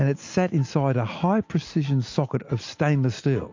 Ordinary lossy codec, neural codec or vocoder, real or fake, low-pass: MP3, 48 kbps; none; real; 7.2 kHz